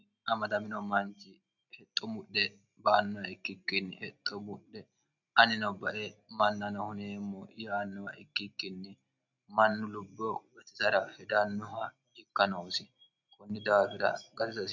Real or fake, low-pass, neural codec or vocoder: real; 7.2 kHz; none